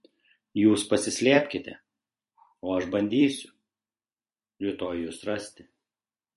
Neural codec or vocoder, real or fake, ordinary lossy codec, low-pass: vocoder, 44.1 kHz, 128 mel bands every 256 samples, BigVGAN v2; fake; MP3, 48 kbps; 14.4 kHz